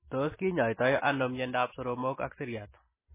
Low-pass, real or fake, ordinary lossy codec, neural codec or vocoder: 3.6 kHz; fake; MP3, 16 kbps; vocoder, 44.1 kHz, 128 mel bands every 256 samples, BigVGAN v2